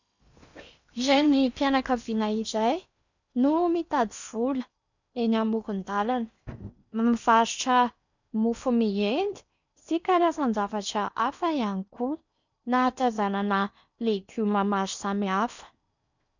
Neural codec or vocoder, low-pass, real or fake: codec, 16 kHz in and 24 kHz out, 0.8 kbps, FocalCodec, streaming, 65536 codes; 7.2 kHz; fake